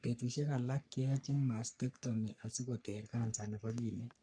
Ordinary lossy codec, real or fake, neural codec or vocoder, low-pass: none; fake; codec, 44.1 kHz, 3.4 kbps, Pupu-Codec; 9.9 kHz